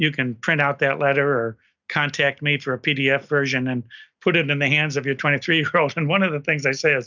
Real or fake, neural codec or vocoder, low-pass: real; none; 7.2 kHz